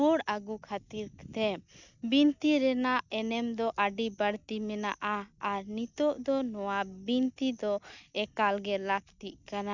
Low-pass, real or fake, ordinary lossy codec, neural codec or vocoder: 7.2 kHz; real; none; none